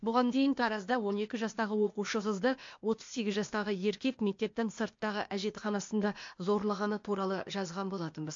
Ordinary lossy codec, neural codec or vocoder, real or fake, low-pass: MP3, 48 kbps; codec, 16 kHz, 0.8 kbps, ZipCodec; fake; 7.2 kHz